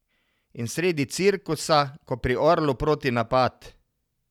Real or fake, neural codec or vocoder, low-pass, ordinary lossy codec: real; none; 19.8 kHz; none